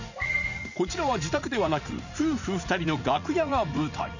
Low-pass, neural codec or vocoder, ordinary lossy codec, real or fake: 7.2 kHz; vocoder, 44.1 kHz, 80 mel bands, Vocos; none; fake